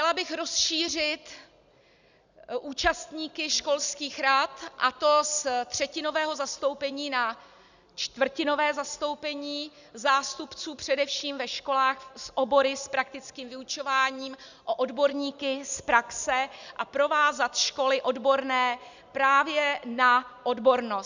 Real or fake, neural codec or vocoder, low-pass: real; none; 7.2 kHz